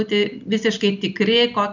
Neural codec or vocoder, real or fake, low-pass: none; real; 7.2 kHz